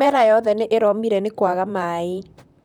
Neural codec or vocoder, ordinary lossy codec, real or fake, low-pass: vocoder, 44.1 kHz, 128 mel bands, Pupu-Vocoder; none; fake; 19.8 kHz